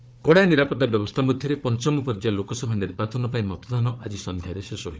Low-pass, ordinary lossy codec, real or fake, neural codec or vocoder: none; none; fake; codec, 16 kHz, 4 kbps, FunCodec, trained on Chinese and English, 50 frames a second